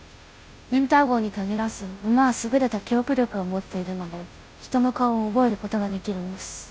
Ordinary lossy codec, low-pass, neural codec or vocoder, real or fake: none; none; codec, 16 kHz, 0.5 kbps, FunCodec, trained on Chinese and English, 25 frames a second; fake